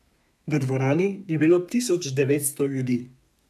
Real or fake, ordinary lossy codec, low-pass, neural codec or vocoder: fake; AAC, 96 kbps; 14.4 kHz; codec, 32 kHz, 1.9 kbps, SNAC